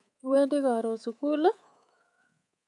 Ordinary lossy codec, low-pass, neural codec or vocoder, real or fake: none; 10.8 kHz; codec, 24 kHz, 3.1 kbps, DualCodec; fake